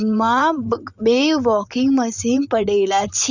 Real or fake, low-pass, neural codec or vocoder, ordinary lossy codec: fake; 7.2 kHz; vocoder, 44.1 kHz, 128 mel bands, Pupu-Vocoder; none